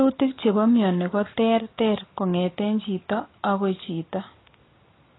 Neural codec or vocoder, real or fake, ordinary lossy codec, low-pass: none; real; AAC, 16 kbps; 7.2 kHz